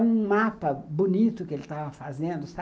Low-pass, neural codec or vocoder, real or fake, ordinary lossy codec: none; none; real; none